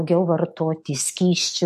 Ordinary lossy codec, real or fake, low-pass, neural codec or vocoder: MP3, 64 kbps; fake; 14.4 kHz; autoencoder, 48 kHz, 128 numbers a frame, DAC-VAE, trained on Japanese speech